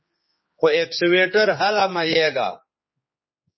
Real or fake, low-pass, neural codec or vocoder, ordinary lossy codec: fake; 7.2 kHz; codec, 16 kHz, 4 kbps, X-Codec, HuBERT features, trained on general audio; MP3, 24 kbps